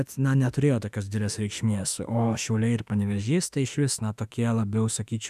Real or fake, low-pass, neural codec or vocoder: fake; 14.4 kHz; autoencoder, 48 kHz, 32 numbers a frame, DAC-VAE, trained on Japanese speech